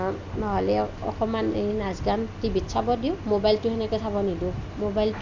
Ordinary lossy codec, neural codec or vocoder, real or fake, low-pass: MP3, 64 kbps; none; real; 7.2 kHz